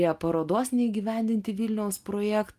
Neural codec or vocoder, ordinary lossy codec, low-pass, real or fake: none; Opus, 32 kbps; 14.4 kHz; real